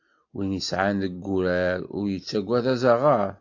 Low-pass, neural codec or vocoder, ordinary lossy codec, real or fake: 7.2 kHz; none; AAC, 48 kbps; real